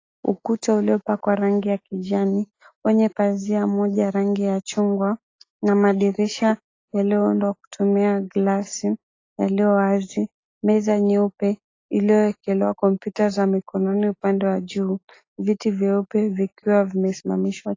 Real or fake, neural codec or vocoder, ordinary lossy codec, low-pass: real; none; AAC, 32 kbps; 7.2 kHz